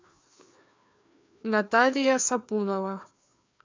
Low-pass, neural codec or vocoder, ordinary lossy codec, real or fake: 7.2 kHz; codec, 16 kHz, 2 kbps, FreqCodec, larger model; MP3, 64 kbps; fake